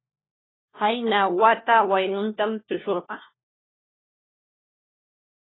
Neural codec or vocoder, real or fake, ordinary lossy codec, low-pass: codec, 16 kHz, 1 kbps, FunCodec, trained on LibriTTS, 50 frames a second; fake; AAC, 16 kbps; 7.2 kHz